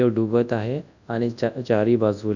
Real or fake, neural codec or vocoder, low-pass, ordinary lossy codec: fake; codec, 24 kHz, 0.9 kbps, WavTokenizer, large speech release; 7.2 kHz; none